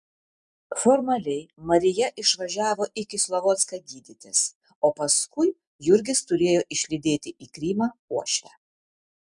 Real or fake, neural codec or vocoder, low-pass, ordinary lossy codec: real; none; 10.8 kHz; MP3, 96 kbps